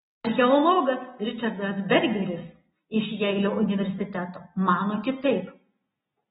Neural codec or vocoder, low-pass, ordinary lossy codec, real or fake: none; 19.8 kHz; AAC, 16 kbps; real